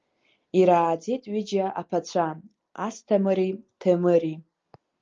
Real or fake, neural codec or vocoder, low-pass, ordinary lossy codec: real; none; 7.2 kHz; Opus, 24 kbps